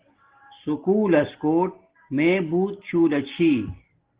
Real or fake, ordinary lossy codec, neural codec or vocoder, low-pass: real; Opus, 16 kbps; none; 3.6 kHz